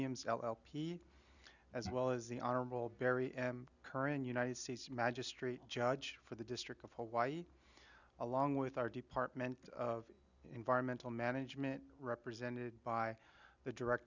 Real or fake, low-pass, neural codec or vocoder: real; 7.2 kHz; none